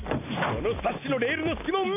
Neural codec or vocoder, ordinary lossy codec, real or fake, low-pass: none; AAC, 32 kbps; real; 3.6 kHz